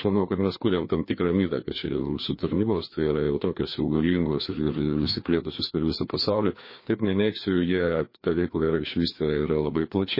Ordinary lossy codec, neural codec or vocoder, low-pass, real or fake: MP3, 24 kbps; codec, 16 kHz, 2 kbps, FreqCodec, larger model; 5.4 kHz; fake